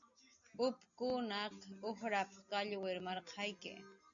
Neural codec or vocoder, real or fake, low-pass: none; real; 7.2 kHz